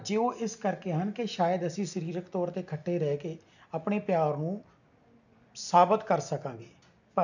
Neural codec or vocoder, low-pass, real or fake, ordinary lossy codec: none; 7.2 kHz; real; none